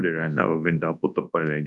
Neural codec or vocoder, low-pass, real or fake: codec, 24 kHz, 0.9 kbps, WavTokenizer, large speech release; 10.8 kHz; fake